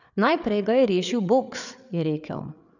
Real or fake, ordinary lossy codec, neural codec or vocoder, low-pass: fake; none; codec, 16 kHz, 8 kbps, FreqCodec, larger model; 7.2 kHz